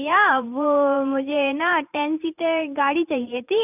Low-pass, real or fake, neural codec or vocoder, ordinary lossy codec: 3.6 kHz; fake; vocoder, 44.1 kHz, 128 mel bands every 512 samples, BigVGAN v2; none